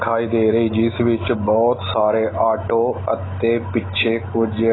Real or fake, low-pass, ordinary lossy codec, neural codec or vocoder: real; 7.2 kHz; AAC, 16 kbps; none